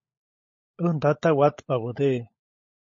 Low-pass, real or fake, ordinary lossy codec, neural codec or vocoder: 7.2 kHz; fake; MP3, 32 kbps; codec, 16 kHz, 16 kbps, FunCodec, trained on LibriTTS, 50 frames a second